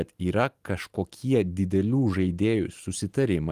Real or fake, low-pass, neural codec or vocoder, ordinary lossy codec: real; 14.4 kHz; none; Opus, 24 kbps